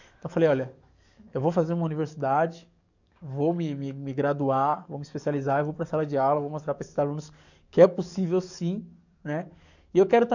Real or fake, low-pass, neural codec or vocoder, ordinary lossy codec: fake; 7.2 kHz; codec, 44.1 kHz, 7.8 kbps, DAC; none